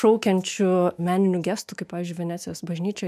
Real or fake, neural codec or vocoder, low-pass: fake; autoencoder, 48 kHz, 128 numbers a frame, DAC-VAE, trained on Japanese speech; 14.4 kHz